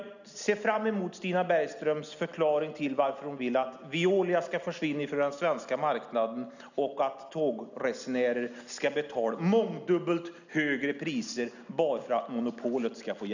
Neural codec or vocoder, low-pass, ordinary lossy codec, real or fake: none; 7.2 kHz; none; real